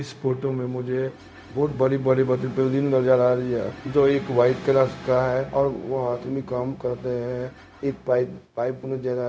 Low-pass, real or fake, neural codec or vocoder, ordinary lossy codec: none; fake; codec, 16 kHz, 0.4 kbps, LongCat-Audio-Codec; none